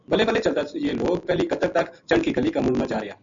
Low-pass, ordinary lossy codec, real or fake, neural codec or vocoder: 7.2 kHz; MP3, 64 kbps; real; none